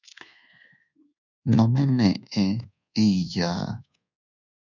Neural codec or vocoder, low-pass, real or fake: codec, 24 kHz, 1.2 kbps, DualCodec; 7.2 kHz; fake